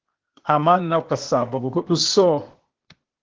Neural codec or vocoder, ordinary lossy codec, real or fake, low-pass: codec, 16 kHz, 0.8 kbps, ZipCodec; Opus, 16 kbps; fake; 7.2 kHz